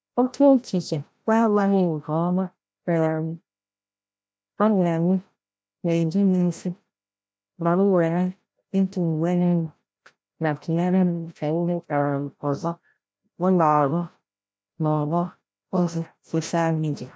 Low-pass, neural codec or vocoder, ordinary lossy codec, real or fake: none; codec, 16 kHz, 0.5 kbps, FreqCodec, larger model; none; fake